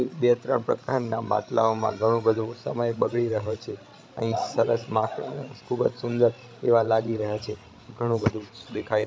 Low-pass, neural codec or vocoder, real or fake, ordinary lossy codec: none; codec, 16 kHz, 16 kbps, FreqCodec, larger model; fake; none